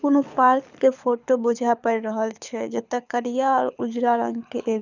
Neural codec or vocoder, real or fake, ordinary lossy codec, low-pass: codec, 24 kHz, 6 kbps, HILCodec; fake; none; 7.2 kHz